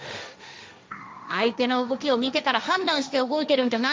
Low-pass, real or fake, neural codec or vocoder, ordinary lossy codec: none; fake; codec, 16 kHz, 1.1 kbps, Voila-Tokenizer; none